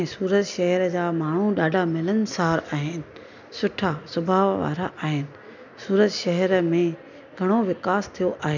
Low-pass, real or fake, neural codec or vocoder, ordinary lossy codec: 7.2 kHz; real; none; none